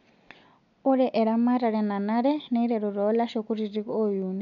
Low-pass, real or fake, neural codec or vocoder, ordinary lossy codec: 7.2 kHz; real; none; none